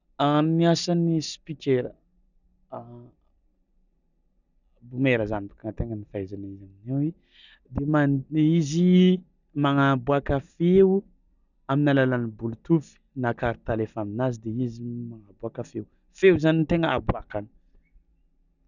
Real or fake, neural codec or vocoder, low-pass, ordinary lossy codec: real; none; 7.2 kHz; none